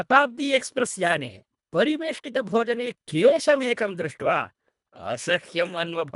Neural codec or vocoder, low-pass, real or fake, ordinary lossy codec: codec, 24 kHz, 1.5 kbps, HILCodec; 10.8 kHz; fake; none